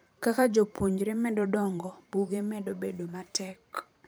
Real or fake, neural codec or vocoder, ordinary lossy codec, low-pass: fake; vocoder, 44.1 kHz, 128 mel bands every 512 samples, BigVGAN v2; none; none